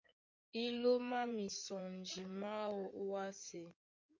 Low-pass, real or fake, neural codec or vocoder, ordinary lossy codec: 7.2 kHz; fake; codec, 16 kHz in and 24 kHz out, 2.2 kbps, FireRedTTS-2 codec; MP3, 48 kbps